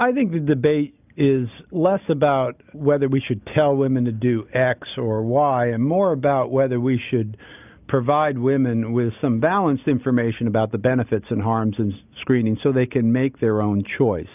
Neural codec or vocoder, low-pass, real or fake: none; 3.6 kHz; real